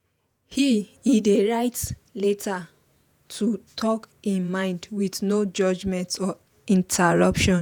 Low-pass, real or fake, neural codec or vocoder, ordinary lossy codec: none; fake; vocoder, 48 kHz, 128 mel bands, Vocos; none